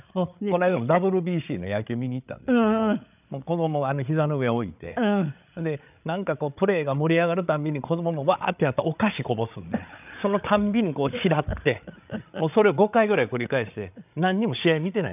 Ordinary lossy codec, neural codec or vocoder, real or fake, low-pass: none; codec, 16 kHz, 8 kbps, FreqCodec, larger model; fake; 3.6 kHz